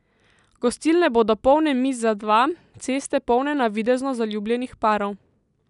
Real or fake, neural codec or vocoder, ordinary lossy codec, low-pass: real; none; none; 10.8 kHz